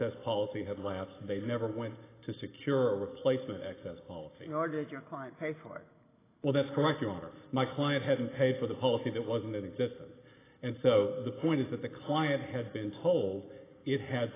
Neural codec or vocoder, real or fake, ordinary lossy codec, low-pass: none; real; AAC, 16 kbps; 3.6 kHz